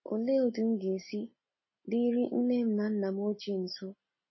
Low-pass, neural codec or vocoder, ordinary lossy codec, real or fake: 7.2 kHz; none; MP3, 24 kbps; real